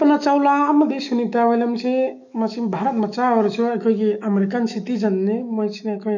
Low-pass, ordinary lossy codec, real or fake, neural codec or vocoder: 7.2 kHz; none; fake; autoencoder, 48 kHz, 128 numbers a frame, DAC-VAE, trained on Japanese speech